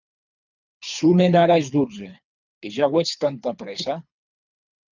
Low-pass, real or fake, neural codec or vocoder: 7.2 kHz; fake; codec, 24 kHz, 3 kbps, HILCodec